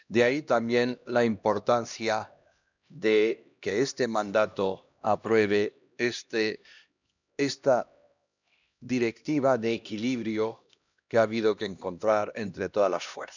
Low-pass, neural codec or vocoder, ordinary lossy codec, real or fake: 7.2 kHz; codec, 16 kHz, 1 kbps, X-Codec, HuBERT features, trained on LibriSpeech; none; fake